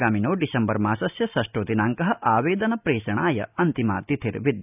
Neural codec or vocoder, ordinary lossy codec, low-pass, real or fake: none; none; 3.6 kHz; real